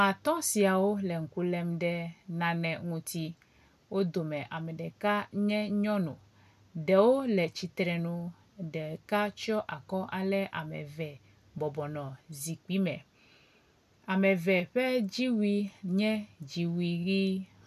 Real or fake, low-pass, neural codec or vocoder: real; 14.4 kHz; none